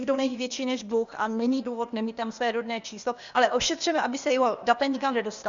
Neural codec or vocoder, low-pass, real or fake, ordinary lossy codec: codec, 16 kHz, 0.8 kbps, ZipCodec; 7.2 kHz; fake; Opus, 64 kbps